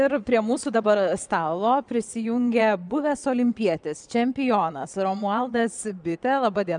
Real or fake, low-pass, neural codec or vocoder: fake; 9.9 kHz; vocoder, 22.05 kHz, 80 mel bands, Vocos